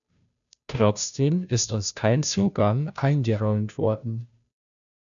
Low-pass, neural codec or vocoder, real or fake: 7.2 kHz; codec, 16 kHz, 0.5 kbps, FunCodec, trained on Chinese and English, 25 frames a second; fake